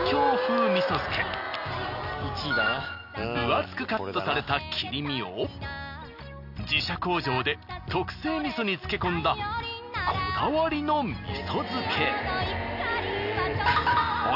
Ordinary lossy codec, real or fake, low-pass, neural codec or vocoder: none; real; 5.4 kHz; none